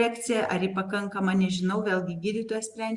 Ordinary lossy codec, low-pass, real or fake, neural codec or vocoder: Opus, 64 kbps; 10.8 kHz; real; none